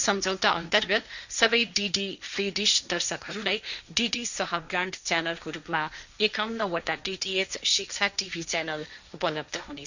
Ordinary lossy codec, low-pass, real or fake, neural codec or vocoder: none; 7.2 kHz; fake; codec, 16 kHz, 1.1 kbps, Voila-Tokenizer